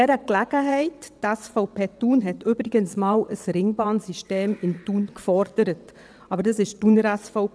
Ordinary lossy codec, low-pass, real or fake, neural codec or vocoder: none; none; fake; vocoder, 22.05 kHz, 80 mel bands, WaveNeXt